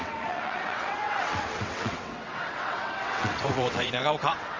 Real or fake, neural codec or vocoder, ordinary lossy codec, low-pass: fake; vocoder, 22.05 kHz, 80 mel bands, WaveNeXt; Opus, 32 kbps; 7.2 kHz